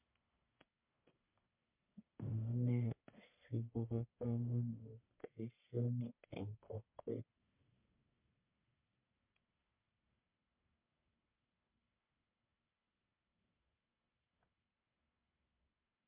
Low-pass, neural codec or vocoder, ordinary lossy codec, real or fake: 3.6 kHz; codec, 44.1 kHz, 1.7 kbps, Pupu-Codec; MP3, 32 kbps; fake